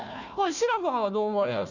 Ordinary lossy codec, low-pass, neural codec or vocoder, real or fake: none; 7.2 kHz; codec, 16 kHz, 1 kbps, FunCodec, trained on Chinese and English, 50 frames a second; fake